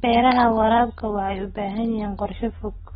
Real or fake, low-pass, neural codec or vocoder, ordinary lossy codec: real; 19.8 kHz; none; AAC, 16 kbps